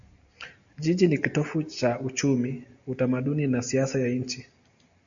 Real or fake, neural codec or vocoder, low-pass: real; none; 7.2 kHz